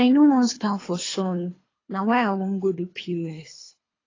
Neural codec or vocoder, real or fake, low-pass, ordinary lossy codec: codec, 24 kHz, 3 kbps, HILCodec; fake; 7.2 kHz; AAC, 32 kbps